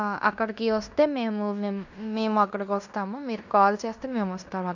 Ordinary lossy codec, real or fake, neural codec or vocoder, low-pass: none; fake; codec, 16 kHz in and 24 kHz out, 0.9 kbps, LongCat-Audio-Codec, fine tuned four codebook decoder; 7.2 kHz